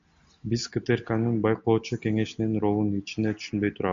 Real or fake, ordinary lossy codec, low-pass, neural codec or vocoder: real; MP3, 64 kbps; 7.2 kHz; none